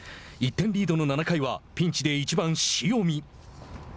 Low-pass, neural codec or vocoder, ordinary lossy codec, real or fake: none; none; none; real